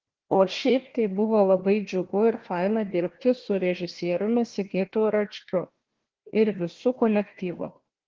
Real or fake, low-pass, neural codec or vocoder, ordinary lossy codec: fake; 7.2 kHz; codec, 16 kHz, 1 kbps, FunCodec, trained on Chinese and English, 50 frames a second; Opus, 16 kbps